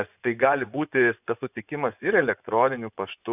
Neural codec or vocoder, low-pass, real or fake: vocoder, 24 kHz, 100 mel bands, Vocos; 3.6 kHz; fake